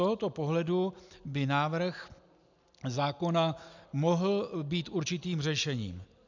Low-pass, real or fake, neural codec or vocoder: 7.2 kHz; real; none